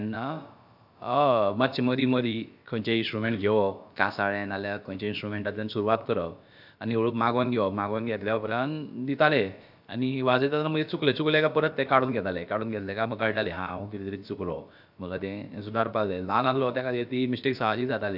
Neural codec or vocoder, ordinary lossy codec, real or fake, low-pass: codec, 16 kHz, about 1 kbps, DyCAST, with the encoder's durations; AAC, 48 kbps; fake; 5.4 kHz